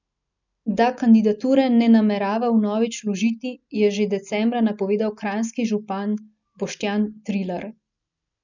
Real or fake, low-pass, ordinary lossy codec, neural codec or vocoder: real; 7.2 kHz; none; none